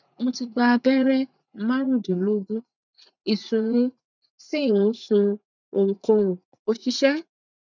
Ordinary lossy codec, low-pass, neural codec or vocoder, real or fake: none; 7.2 kHz; vocoder, 44.1 kHz, 80 mel bands, Vocos; fake